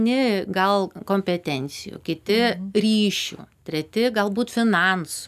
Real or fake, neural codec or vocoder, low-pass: real; none; 14.4 kHz